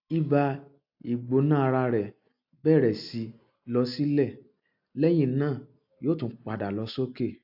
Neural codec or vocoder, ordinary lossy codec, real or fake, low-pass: none; AAC, 48 kbps; real; 5.4 kHz